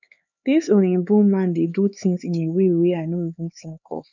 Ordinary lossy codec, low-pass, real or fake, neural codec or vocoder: none; 7.2 kHz; fake; codec, 16 kHz, 4 kbps, X-Codec, HuBERT features, trained on LibriSpeech